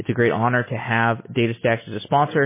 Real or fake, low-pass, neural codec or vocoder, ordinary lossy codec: real; 3.6 kHz; none; MP3, 16 kbps